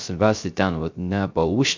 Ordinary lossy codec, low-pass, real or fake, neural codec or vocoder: MP3, 64 kbps; 7.2 kHz; fake; codec, 16 kHz, 0.3 kbps, FocalCodec